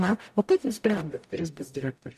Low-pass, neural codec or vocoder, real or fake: 14.4 kHz; codec, 44.1 kHz, 0.9 kbps, DAC; fake